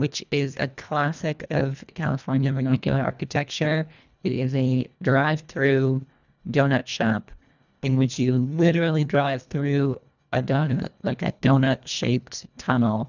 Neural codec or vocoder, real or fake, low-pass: codec, 24 kHz, 1.5 kbps, HILCodec; fake; 7.2 kHz